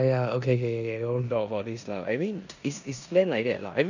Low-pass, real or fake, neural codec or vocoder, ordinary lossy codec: 7.2 kHz; fake; codec, 16 kHz in and 24 kHz out, 0.9 kbps, LongCat-Audio-Codec, four codebook decoder; none